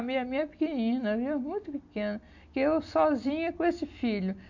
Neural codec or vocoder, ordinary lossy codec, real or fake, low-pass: none; AAC, 48 kbps; real; 7.2 kHz